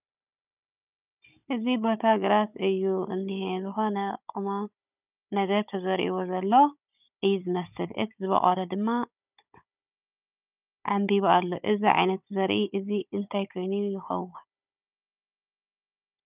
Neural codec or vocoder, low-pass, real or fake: codec, 16 kHz, 16 kbps, FunCodec, trained on Chinese and English, 50 frames a second; 3.6 kHz; fake